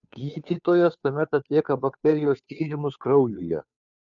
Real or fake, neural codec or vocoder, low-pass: fake; codec, 16 kHz, 2 kbps, FunCodec, trained on Chinese and English, 25 frames a second; 7.2 kHz